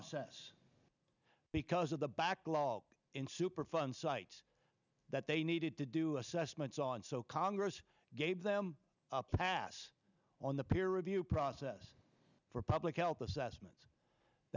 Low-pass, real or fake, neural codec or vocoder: 7.2 kHz; real; none